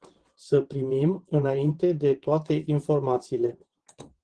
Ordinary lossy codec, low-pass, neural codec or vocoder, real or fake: Opus, 16 kbps; 9.9 kHz; vocoder, 22.05 kHz, 80 mel bands, WaveNeXt; fake